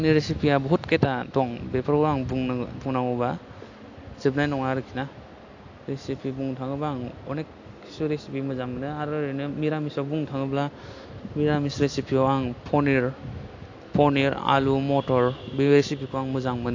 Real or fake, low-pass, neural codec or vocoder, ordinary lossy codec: real; 7.2 kHz; none; AAC, 48 kbps